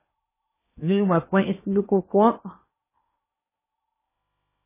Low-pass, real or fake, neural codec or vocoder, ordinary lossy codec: 3.6 kHz; fake; codec, 16 kHz in and 24 kHz out, 0.8 kbps, FocalCodec, streaming, 65536 codes; MP3, 16 kbps